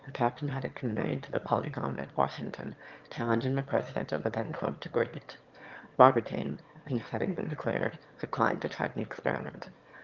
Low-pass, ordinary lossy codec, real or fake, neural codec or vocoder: 7.2 kHz; Opus, 32 kbps; fake; autoencoder, 22.05 kHz, a latent of 192 numbers a frame, VITS, trained on one speaker